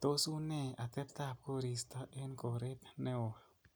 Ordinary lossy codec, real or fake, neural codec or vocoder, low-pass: none; real; none; none